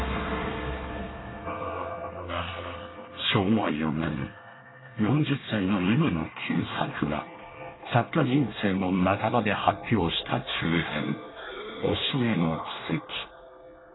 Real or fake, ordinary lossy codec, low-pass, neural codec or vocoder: fake; AAC, 16 kbps; 7.2 kHz; codec, 24 kHz, 1 kbps, SNAC